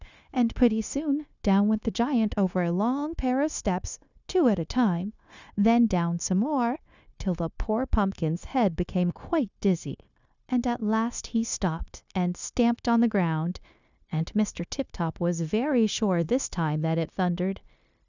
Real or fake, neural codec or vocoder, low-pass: fake; codec, 16 kHz, 0.9 kbps, LongCat-Audio-Codec; 7.2 kHz